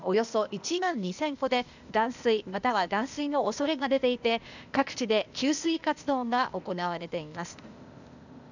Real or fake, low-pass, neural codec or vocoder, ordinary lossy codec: fake; 7.2 kHz; codec, 16 kHz, 0.8 kbps, ZipCodec; none